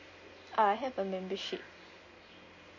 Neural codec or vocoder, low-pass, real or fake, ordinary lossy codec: none; 7.2 kHz; real; MP3, 32 kbps